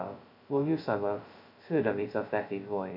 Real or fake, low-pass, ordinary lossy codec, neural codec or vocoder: fake; 5.4 kHz; none; codec, 16 kHz, 0.2 kbps, FocalCodec